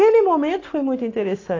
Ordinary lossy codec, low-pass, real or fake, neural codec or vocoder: AAC, 32 kbps; 7.2 kHz; real; none